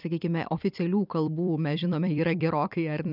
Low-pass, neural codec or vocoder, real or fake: 5.4 kHz; vocoder, 44.1 kHz, 128 mel bands every 256 samples, BigVGAN v2; fake